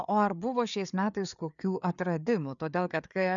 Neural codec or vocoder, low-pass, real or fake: codec, 16 kHz, 4 kbps, FreqCodec, larger model; 7.2 kHz; fake